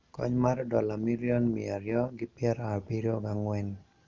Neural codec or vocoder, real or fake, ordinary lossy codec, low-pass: none; real; Opus, 24 kbps; 7.2 kHz